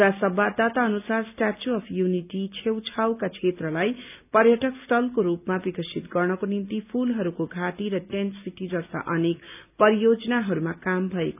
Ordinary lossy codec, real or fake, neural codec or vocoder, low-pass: none; real; none; 3.6 kHz